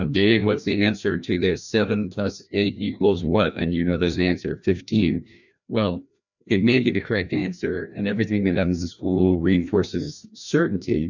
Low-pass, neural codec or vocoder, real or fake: 7.2 kHz; codec, 16 kHz, 1 kbps, FreqCodec, larger model; fake